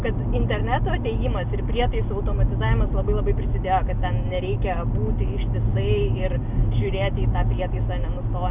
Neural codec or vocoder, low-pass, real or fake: none; 3.6 kHz; real